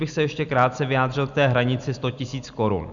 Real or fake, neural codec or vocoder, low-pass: real; none; 7.2 kHz